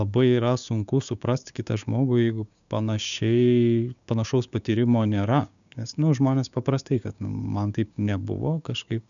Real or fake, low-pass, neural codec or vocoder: fake; 7.2 kHz; codec, 16 kHz, 6 kbps, DAC